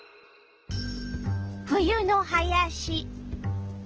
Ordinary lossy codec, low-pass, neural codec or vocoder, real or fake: Opus, 16 kbps; 7.2 kHz; none; real